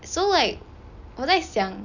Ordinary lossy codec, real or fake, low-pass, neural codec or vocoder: none; real; 7.2 kHz; none